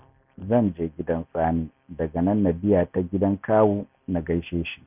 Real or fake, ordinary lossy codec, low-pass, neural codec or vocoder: real; none; 3.6 kHz; none